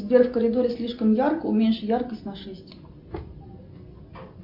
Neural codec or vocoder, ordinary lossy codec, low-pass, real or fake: none; MP3, 48 kbps; 5.4 kHz; real